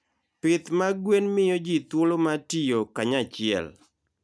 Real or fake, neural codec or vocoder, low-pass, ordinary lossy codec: real; none; none; none